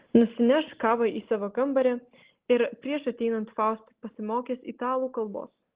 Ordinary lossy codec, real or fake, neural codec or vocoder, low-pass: Opus, 16 kbps; real; none; 3.6 kHz